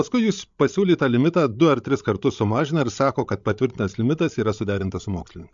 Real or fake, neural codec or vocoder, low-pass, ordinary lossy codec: fake; codec, 16 kHz, 16 kbps, FreqCodec, larger model; 7.2 kHz; MP3, 64 kbps